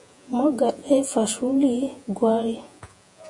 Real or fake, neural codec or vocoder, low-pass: fake; vocoder, 48 kHz, 128 mel bands, Vocos; 10.8 kHz